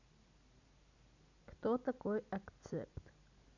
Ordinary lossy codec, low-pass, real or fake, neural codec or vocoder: none; 7.2 kHz; real; none